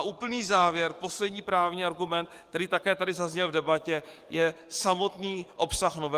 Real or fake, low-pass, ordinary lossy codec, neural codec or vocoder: fake; 14.4 kHz; Opus, 32 kbps; codec, 44.1 kHz, 7.8 kbps, DAC